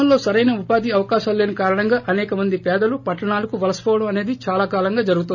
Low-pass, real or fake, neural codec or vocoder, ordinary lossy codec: 7.2 kHz; real; none; none